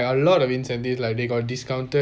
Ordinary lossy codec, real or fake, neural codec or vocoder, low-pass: none; real; none; none